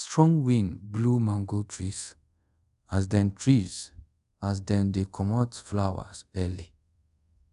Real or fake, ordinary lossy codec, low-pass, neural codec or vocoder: fake; none; 10.8 kHz; codec, 24 kHz, 0.5 kbps, DualCodec